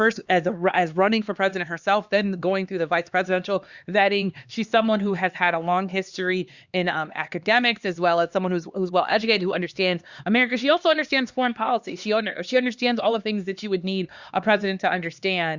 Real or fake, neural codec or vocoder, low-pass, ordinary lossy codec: fake; codec, 16 kHz, 2 kbps, X-Codec, HuBERT features, trained on LibriSpeech; 7.2 kHz; Opus, 64 kbps